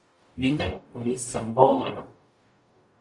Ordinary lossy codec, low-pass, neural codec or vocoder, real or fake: Opus, 64 kbps; 10.8 kHz; codec, 44.1 kHz, 0.9 kbps, DAC; fake